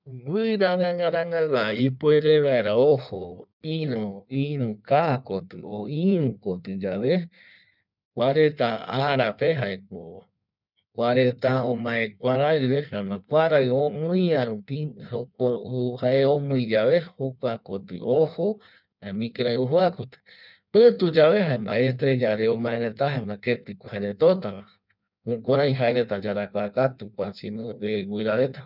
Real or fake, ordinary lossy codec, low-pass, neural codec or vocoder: fake; none; 5.4 kHz; codec, 16 kHz in and 24 kHz out, 1.1 kbps, FireRedTTS-2 codec